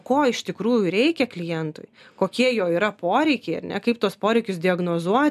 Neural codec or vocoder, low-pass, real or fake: none; 14.4 kHz; real